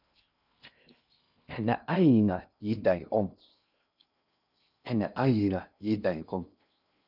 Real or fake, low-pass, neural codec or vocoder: fake; 5.4 kHz; codec, 16 kHz in and 24 kHz out, 0.6 kbps, FocalCodec, streaming, 2048 codes